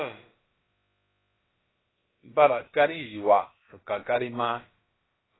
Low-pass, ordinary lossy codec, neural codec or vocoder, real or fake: 7.2 kHz; AAC, 16 kbps; codec, 16 kHz, about 1 kbps, DyCAST, with the encoder's durations; fake